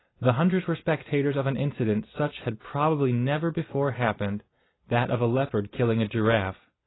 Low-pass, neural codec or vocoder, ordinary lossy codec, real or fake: 7.2 kHz; none; AAC, 16 kbps; real